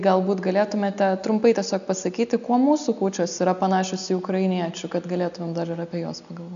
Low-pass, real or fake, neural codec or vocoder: 7.2 kHz; real; none